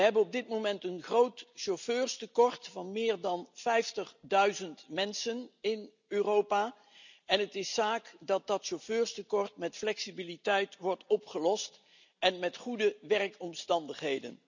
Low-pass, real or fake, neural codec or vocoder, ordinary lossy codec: 7.2 kHz; real; none; none